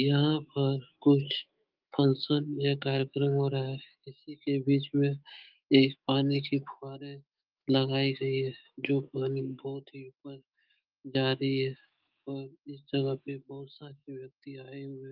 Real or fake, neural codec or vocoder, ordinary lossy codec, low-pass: fake; autoencoder, 48 kHz, 128 numbers a frame, DAC-VAE, trained on Japanese speech; Opus, 24 kbps; 5.4 kHz